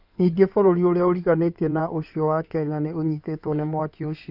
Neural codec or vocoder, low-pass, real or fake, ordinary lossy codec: codec, 16 kHz in and 24 kHz out, 2.2 kbps, FireRedTTS-2 codec; 5.4 kHz; fake; none